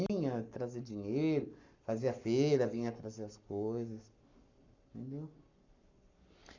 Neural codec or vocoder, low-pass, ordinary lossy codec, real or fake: codec, 44.1 kHz, 7.8 kbps, Pupu-Codec; 7.2 kHz; none; fake